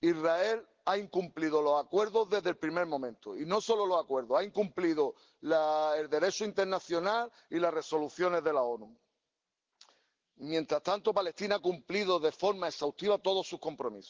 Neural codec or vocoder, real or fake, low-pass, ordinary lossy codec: none; real; 7.2 kHz; Opus, 16 kbps